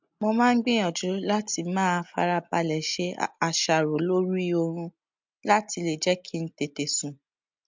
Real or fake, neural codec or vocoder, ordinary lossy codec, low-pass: real; none; MP3, 64 kbps; 7.2 kHz